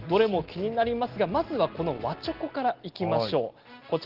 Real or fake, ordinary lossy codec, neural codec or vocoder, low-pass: real; Opus, 16 kbps; none; 5.4 kHz